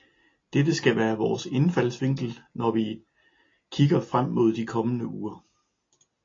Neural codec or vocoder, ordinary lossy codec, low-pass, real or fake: none; AAC, 32 kbps; 7.2 kHz; real